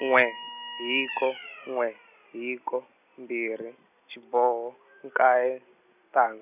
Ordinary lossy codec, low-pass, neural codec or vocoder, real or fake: none; 3.6 kHz; none; real